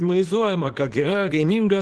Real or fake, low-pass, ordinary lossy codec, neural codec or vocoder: fake; 10.8 kHz; Opus, 16 kbps; codec, 24 kHz, 0.9 kbps, WavTokenizer, small release